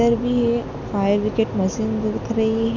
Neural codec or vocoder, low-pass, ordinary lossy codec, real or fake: none; 7.2 kHz; none; real